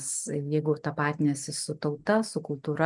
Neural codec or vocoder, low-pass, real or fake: none; 10.8 kHz; real